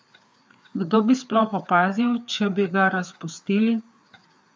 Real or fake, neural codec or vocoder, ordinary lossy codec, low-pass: fake; codec, 16 kHz, 4 kbps, FreqCodec, larger model; none; none